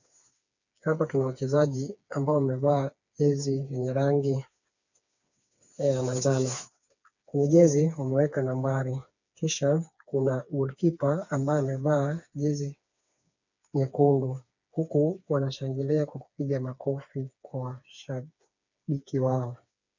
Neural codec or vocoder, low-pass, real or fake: codec, 16 kHz, 4 kbps, FreqCodec, smaller model; 7.2 kHz; fake